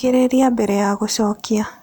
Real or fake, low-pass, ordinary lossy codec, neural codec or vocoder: real; none; none; none